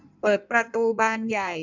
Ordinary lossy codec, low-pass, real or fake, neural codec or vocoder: none; 7.2 kHz; fake; codec, 16 kHz in and 24 kHz out, 1.1 kbps, FireRedTTS-2 codec